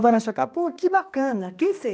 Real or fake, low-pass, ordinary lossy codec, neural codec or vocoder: fake; none; none; codec, 16 kHz, 1 kbps, X-Codec, HuBERT features, trained on balanced general audio